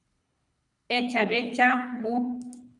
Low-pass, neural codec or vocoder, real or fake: 10.8 kHz; codec, 24 kHz, 3 kbps, HILCodec; fake